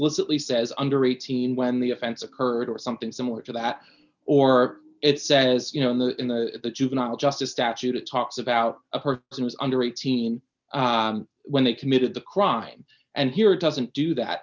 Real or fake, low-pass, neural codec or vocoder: real; 7.2 kHz; none